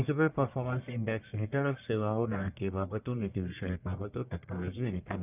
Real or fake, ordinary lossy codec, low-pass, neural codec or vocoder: fake; none; 3.6 kHz; codec, 44.1 kHz, 1.7 kbps, Pupu-Codec